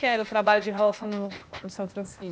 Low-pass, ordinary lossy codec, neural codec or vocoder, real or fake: none; none; codec, 16 kHz, 0.8 kbps, ZipCodec; fake